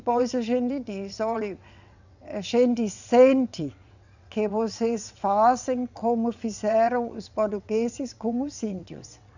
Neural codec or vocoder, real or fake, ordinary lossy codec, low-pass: vocoder, 22.05 kHz, 80 mel bands, WaveNeXt; fake; none; 7.2 kHz